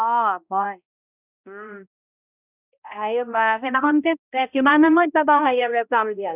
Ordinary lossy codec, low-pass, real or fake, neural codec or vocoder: none; 3.6 kHz; fake; codec, 16 kHz, 0.5 kbps, X-Codec, HuBERT features, trained on balanced general audio